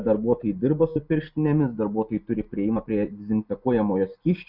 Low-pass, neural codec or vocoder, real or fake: 5.4 kHz; none; real